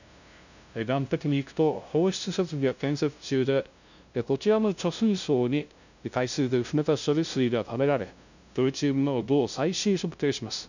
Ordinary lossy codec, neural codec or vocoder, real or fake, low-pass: none; codec, 16 kHz, 0.5 kbps, FunCodec, trained on LibriTTS, 25 frames a second; fake; 7.2 kHz